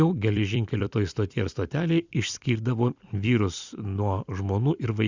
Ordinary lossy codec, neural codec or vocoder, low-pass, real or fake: Opus, 64 kbps; vocoder, 44.1 kHz, 128 mel bands every 256 samples, BigVGAN v2; 7.2 kHz; fake